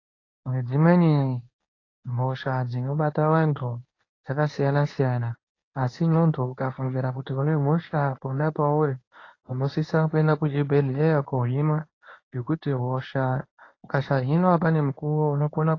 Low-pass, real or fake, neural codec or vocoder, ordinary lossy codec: 7.2 kHz; fake; codec, 24 kHz, 0.9 kbps, WavTokenizer, medium speech release version 1; AAC, 32 kbps